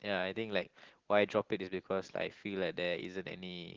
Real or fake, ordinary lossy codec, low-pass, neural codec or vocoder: real; Opus, 16 kbps; 7.2 kHz; none